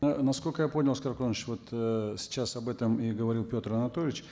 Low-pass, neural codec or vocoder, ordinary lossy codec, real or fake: none; none; none; real